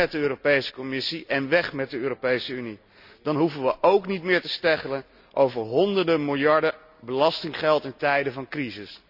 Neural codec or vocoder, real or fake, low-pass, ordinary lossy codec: none; real; 5.4 kHz; none